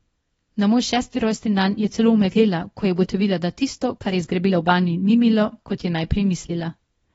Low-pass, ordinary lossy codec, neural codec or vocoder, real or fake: 10.8 kHz; AAC, 24 kbps; codec, 24 kHz, 0.9 kbps, WavTokenizer, small release; fake